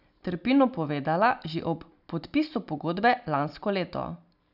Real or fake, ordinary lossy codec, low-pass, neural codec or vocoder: real; none; 5.4 kHz; none